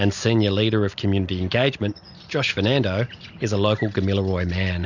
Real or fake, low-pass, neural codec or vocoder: real; 7.2 kHz; none